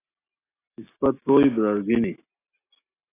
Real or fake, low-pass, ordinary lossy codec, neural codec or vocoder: real; 3.6 kHz; AAC, 16 kbps; none